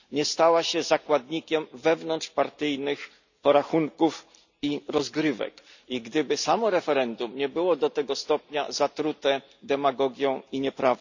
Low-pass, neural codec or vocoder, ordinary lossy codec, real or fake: 7.2 kHz; none; none; real